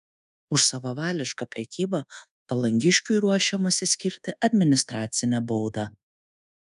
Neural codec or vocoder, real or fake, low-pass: codec, 24 kHz, 1.2 kbps, DualCodec; fake; 10.8 kHz